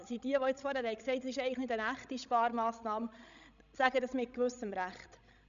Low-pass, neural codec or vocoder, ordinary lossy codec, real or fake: 7.2 kHz; codec, 16 kHz, 16 kbps, FreqCodec, larger model; none; fake